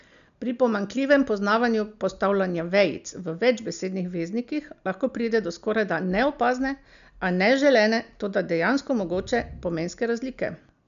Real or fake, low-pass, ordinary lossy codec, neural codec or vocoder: real; 7.2 kHz; MP3, 96 kbps; none